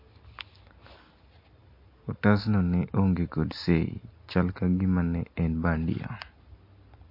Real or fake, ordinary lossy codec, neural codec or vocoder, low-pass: real; MP3, 32 kbps; none; 5.4 kHz